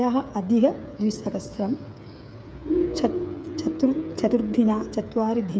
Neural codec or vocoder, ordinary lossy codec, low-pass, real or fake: codec, 16 kHz, 8 kbps, FreqCodec, smaller model; none; none; fake